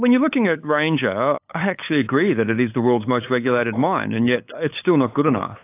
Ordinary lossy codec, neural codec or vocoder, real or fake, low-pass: AAC, 24 kbps; codec, 16 kHz, 8 kbps, FunCodec, trained on LibriTTS, 25 frames a second; fake; 3.6 kHz